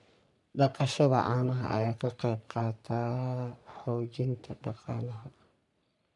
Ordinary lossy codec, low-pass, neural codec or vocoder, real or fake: none; 10.8 kHz; codec, 44.1 kHz, 3.4 kbps, Pupu-Codec; fake